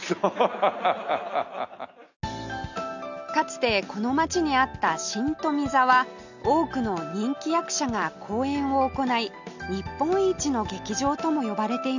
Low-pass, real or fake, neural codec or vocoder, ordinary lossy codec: 7.2 kHz; real; none; none